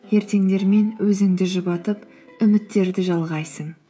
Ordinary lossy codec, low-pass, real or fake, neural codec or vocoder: none; none; fake; codec, 16 kHz, 16 kbps, FreqCodec, smaller model